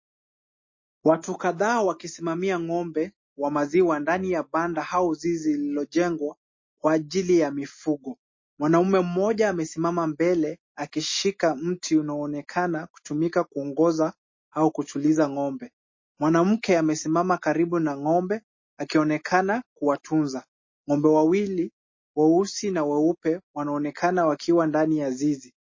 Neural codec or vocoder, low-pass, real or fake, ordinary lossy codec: none; 7.2 kHz; real; MP3, 32 kbps